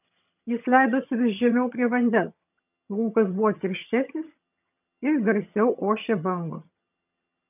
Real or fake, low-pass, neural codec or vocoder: fake; 3.6 kHz; vocoder, 22.05 kHz, 80 mel bands, HiFi-GAN